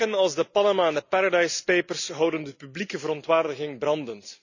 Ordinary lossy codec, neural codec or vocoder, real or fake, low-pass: none; none; real; 7.2 kHz